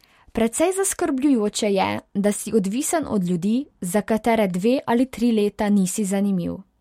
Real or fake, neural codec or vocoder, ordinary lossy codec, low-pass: real; none; MP3, 64 kbps; 19.8 kHz